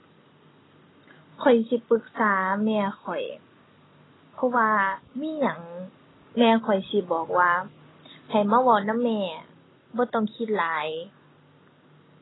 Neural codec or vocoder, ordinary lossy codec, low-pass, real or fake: none; AAC, 16 kbps; 7.2 kHz; real